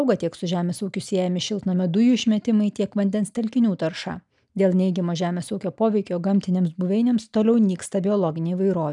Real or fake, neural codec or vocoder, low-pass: real; none; 10.8 kHz